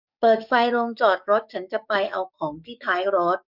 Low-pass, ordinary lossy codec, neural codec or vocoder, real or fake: 5.4 kHz; none; codec, 44.1 kHz, 7.8 kbps, DAC; fake